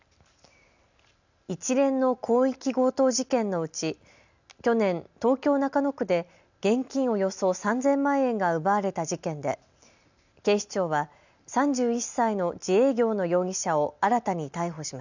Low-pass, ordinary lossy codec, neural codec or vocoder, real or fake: 7.2 kHz; none; none; real